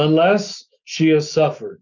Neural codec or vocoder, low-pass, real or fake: none; 7.2 kHz; real